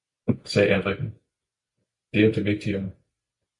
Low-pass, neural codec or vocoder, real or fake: 10.8 kHz; none; real